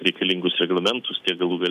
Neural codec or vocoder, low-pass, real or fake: none; 14.4 kHz; real